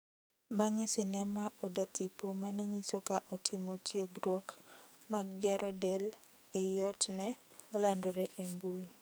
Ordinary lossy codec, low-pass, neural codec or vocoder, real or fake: none; none; codec, 44.1 kHz, 2.6 kbps, SNAC; fake